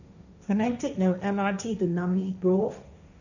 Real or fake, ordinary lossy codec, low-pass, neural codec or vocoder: fake; none; 7.2 kHz; codec, 16 kHz, 1.1 kbps, Voila-Tokenizer